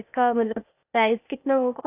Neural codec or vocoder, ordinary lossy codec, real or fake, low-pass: codec, 16 kHz, 0.8 kbps, ZipCodec; none; fake; 3.6 kHz